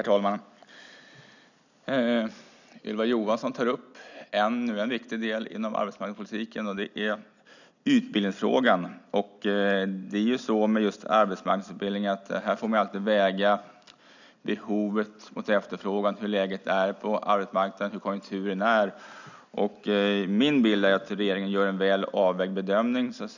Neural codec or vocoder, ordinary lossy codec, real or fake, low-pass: none; none; real; 7.2 kHz